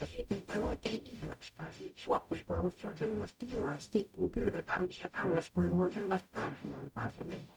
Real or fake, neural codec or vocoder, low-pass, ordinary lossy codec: fake; codec, 44.1 kHz, 0.9 kbps, DAC; 19.8 kHz; none